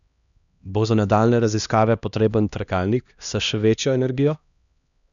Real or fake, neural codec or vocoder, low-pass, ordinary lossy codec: fake; codec, 16 kHz, 1 kbps, X-Codec, HuBERT features, trained on LibriSpeech; 7.2 kHz; none